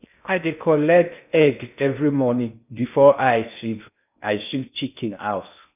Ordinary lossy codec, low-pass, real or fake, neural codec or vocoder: none; 3.6 kHz; fake; codec, 16 kHz in and 24 kHz out, 0.6 kbps, FocalCodec, streaming, 2048 codes